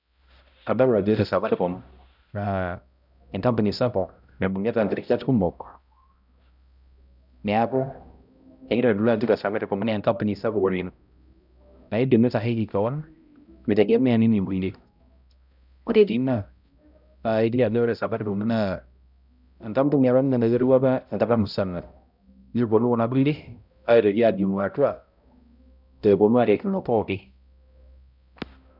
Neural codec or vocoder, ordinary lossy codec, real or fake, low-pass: codec, 16 kHz, 0.5 kbps, X-Codec, HuBERT features, trained on balanced general audio; none; fake; 5.4 kHz